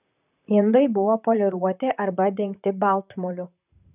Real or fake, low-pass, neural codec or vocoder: fake; 3.6 kHz; vocoder, 44.1 kHz, 128 mel bands, Pupu-Vocoder